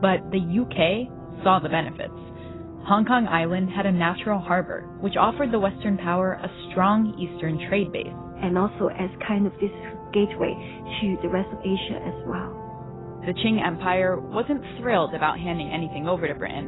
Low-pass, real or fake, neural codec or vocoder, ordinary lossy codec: 7.2 kHz; real; none; AAC, 16 kbps